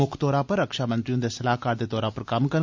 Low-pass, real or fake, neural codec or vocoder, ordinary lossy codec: 7.2 kHz; real; none; MP3, 64 kbps